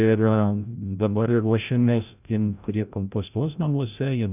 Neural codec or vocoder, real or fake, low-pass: codec, 16 kHz, 0.5 kbps, FreqCodec, larger model; fake; 3.6 kHz